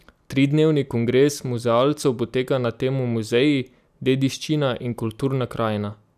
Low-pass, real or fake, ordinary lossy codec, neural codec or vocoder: 14.4 kHz; real; none; none